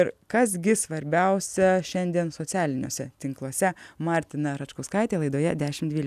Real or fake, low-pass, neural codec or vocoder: real; 14.4 kHz; none